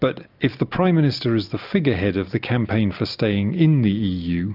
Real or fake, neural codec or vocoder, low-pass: real; none; 5.4 kHz